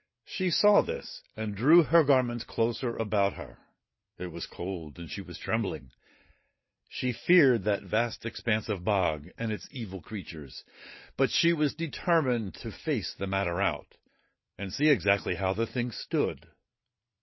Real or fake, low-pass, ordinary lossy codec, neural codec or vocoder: real; 7.2 kHz; MP3, 24 kbps; none